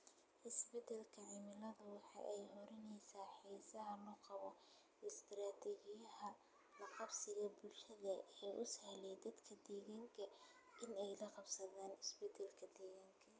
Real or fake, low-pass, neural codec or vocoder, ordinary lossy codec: real; none; none; none